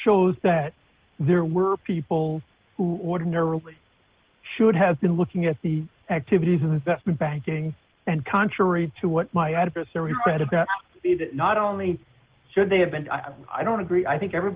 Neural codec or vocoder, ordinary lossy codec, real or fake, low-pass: none; Opus, 16 kbps; real; 3.6 kHz